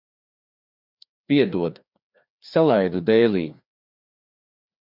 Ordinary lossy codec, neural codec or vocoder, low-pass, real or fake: MP3, 48 kbps; codec, 16 kHz, 2 kbps, FreqCodec, larger model; 5.4 kHz; fake